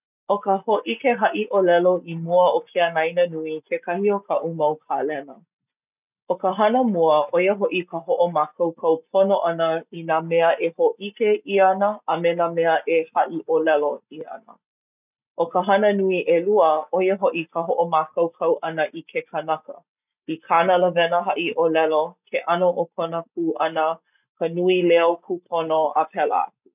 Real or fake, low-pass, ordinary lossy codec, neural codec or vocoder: real; 3.6 kHz; none; none